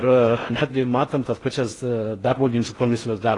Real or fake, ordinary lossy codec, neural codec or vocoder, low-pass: fake; AAC, 32 kbps; codec, 16 kHz in and 24 kHz out, 0.6 kbps, FocalCodec, streaming, 4096 codes; 10.8 kHz